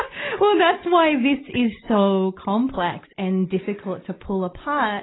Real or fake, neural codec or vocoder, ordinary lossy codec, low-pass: real; none; AAC, 16 kbps; 7.2 kHz